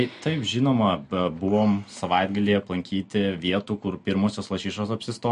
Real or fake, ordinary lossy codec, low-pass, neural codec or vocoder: real; MP3, 48 kbps; 14.4 kHz; none